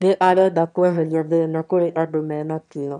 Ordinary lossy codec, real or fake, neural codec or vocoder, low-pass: none; fake; autoencoder, 22.05 kHz, a latent of 192 numbers a frame, VITS, trained on one speaker; 9.9 kHz